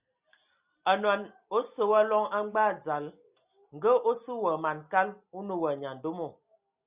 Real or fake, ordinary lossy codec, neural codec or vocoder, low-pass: real; Opus, 64 kbps; none; 3.6 kHz